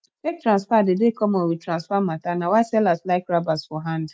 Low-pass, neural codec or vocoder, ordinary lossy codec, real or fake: none; none; none; real